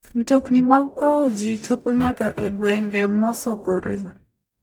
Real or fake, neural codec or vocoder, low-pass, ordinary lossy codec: fake; codec, 44.1 kHz, 0.9 kbps, DAC; none; none